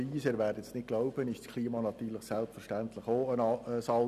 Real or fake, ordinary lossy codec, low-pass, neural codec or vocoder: real; none; 14.4 kHz; none